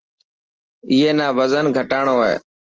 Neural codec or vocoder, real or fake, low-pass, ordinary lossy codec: none; real; 7.2 kHz; Opus, 16 kbps